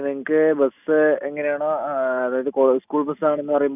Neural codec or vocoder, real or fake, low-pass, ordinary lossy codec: none; real; 3.6 kHz; none